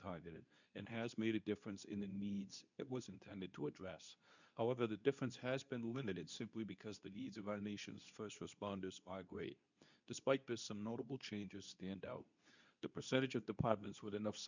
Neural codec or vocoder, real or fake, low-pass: codec, 24 kHz, 0.9 kbps, WavTokenizer, medium speech release version 2; fake; 7.2 kHz